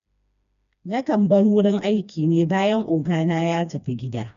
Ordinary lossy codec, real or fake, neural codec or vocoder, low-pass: AAC, 96 kbps; fake; codec, 16 kHz, 2 kbps, FreqCodec, smaller model; 7.2 kHz